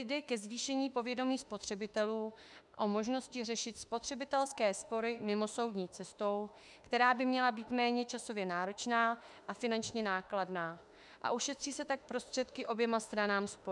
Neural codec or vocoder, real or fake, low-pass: autoencoder, 48 kHz, 32 numbers a frame, DAC-VAE, trained on Japanese speech; fake; 10.8 kHz